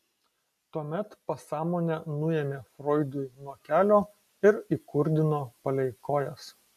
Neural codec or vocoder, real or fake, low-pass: none; real; 14.4 kHz